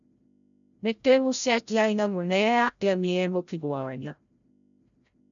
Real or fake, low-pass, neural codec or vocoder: fake; 7.2 kHz; codec, 16 kHz, 0.5 kbps, FreqCodec, larger model